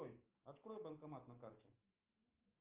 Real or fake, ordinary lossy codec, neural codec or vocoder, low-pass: fake; Opus, 24 kbps; autoencoder, 48 kHz, 128 numbers a frame, DAC-VAE, trained on Japanese speech; 3.6 kHz